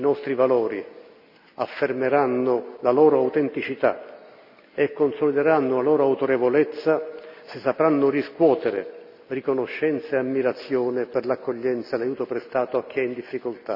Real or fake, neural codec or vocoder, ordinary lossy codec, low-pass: real; none; none; 5.4 kHz